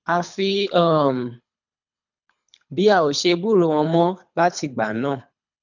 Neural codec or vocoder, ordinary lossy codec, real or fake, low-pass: codec, 24 kHz, 3 kbps, HILCodec; none; fake; 7.2 kHz